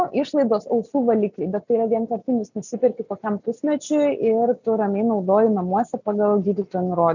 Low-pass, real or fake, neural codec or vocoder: 7.2 kHz; real; none